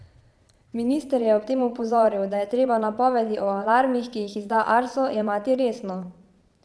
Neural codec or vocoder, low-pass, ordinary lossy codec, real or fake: vocoder, 22.05 kHz, 80 mel bands, Vocos; none; none; fake